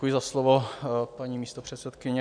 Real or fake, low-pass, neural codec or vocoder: real; 9.9 kHz; none